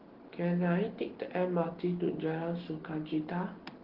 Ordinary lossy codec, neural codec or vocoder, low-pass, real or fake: Opus, 16 kbps; none; 5.4 kHz; real